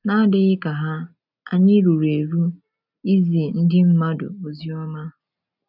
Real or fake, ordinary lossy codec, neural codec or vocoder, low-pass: real; none; none; 5.4 kHz